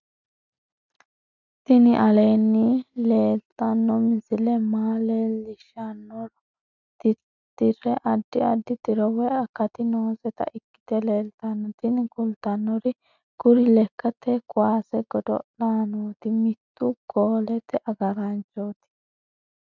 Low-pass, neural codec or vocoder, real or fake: 7.2 kHz; none; real